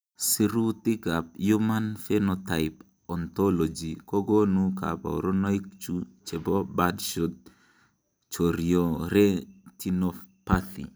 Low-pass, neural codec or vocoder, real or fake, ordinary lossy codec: none; none; real; none